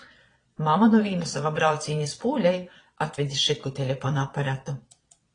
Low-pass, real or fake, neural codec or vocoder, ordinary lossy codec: 9.9 kHz; fake; vocoder, 22.05 kHz, 80 mel bands, Vocos; AAC, 32 kbps